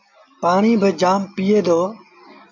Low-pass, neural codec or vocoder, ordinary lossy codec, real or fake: 7.2 kHz; none; AAC, 48 kbps; real